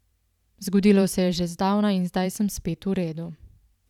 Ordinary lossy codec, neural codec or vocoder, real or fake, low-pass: none; vocoder, 44.1 kHz, 128 mel bands every 512 samples, BigVGAN v2; fake; 19.8 kHz